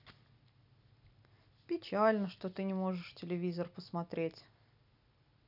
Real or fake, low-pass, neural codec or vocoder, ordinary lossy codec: real; 5.4 kHz; none; none